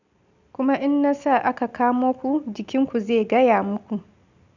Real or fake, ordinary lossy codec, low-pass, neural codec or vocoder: real; none; 7.2 kHz; none